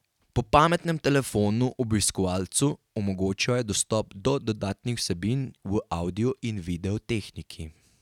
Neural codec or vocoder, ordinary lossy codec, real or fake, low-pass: none; none; real; 19.8 kHz